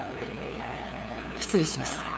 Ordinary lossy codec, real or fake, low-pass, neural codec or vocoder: none; fake; none; codec, 16 kHz, 2 kbps, FunCodec, trained on LibriTTS, 25 frames a second